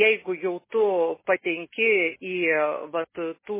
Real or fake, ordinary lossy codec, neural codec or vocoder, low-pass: real; MP3, 16 kbps; none; 3.6 kHz